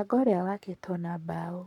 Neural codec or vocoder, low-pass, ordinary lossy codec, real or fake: codec, 44.1 kHz, 7.8 kbps, Pupu-Codec; 19.8 kHz; none; fake